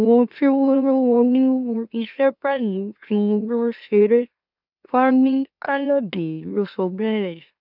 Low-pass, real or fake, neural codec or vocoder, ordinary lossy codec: 5.4 kHz; fake; autoencoder, 44.1 kHz, a latent of 192 numbers a frame, MeloTTS; none